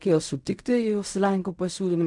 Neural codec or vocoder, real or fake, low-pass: codec, 16 kHz in and 24 kHz out, 0.4 kbps, LongCat-Audio-Codec, fine tuned four codebook decoder; fake; 10.8 kHz